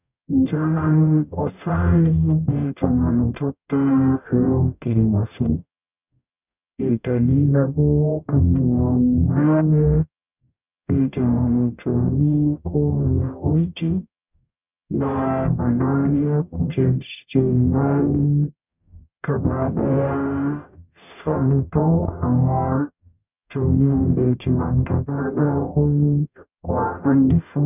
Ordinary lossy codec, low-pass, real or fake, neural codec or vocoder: none; 3.6 kHz; fake; codec, 44.1 kHz, 0.9 kbps, DAC